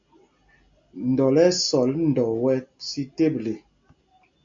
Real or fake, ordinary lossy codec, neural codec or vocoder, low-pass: real; AAC, 64 kbps; none; 7.2 kHz